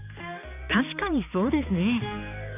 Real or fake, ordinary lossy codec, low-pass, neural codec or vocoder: fake; none; 3.6 kHz; codec, 16 kHz, 2 kbps, X-Codec, HuBERT features, trained on balanced general audio